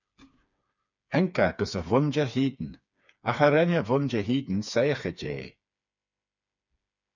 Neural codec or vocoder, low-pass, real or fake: codec, 16 kHz, 4 kbps, FreqCodec, smaller model; 7.2 kHz; fake